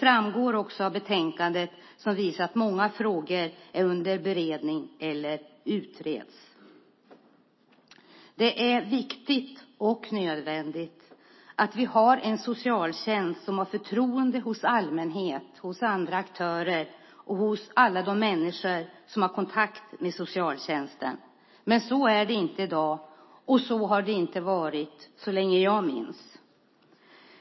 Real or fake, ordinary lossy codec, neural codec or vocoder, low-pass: real; MP3, 24 kbps; none; 7.2 kHz